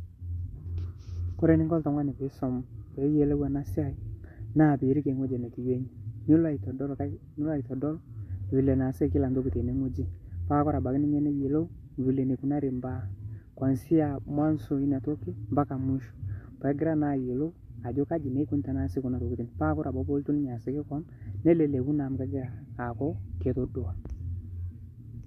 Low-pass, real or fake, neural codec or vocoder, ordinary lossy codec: 14.4 kHz; real; none; MP3, 64 kbps